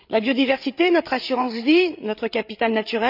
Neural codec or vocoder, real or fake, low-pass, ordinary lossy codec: codec, 16 kHz, 8 kbps, FreqCodec, larger model; fake; 5.4 kHz; none